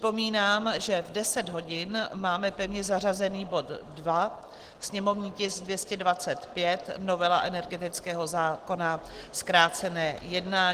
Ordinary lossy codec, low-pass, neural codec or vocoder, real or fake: Opus, 16 kbps; 14.4 kHz; none; real